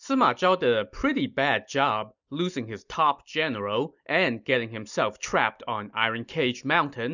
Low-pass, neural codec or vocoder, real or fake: 7.2 kHz; none; real